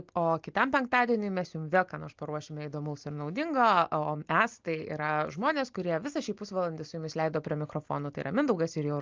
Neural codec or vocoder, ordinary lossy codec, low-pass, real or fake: none; Opus, 32 kbps; 7.2 kHz; real